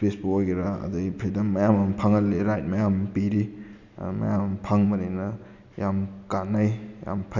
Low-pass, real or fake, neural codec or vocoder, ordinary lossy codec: 7.2 kHz; real; none; none